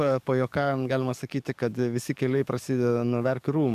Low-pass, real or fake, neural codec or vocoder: 14.4 kHz; fake; codec, 44.1 kHz, 7.8 kbps, DAC